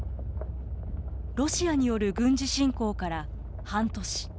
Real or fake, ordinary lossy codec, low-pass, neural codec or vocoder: real; none; none; none